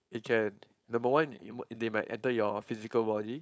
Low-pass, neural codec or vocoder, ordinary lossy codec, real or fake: none; codec, 16 kHz, 4.8 kbps, FACodec; none; fake